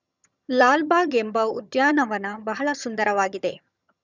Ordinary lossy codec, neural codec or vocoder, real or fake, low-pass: none; vocoder, 22.05 kHz, 80 mel bands, HiFi-GAN; fake; 7.2 kHz